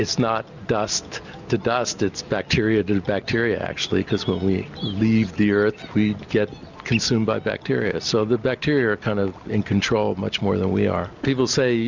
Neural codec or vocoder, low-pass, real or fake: none; 7.2 kHz; real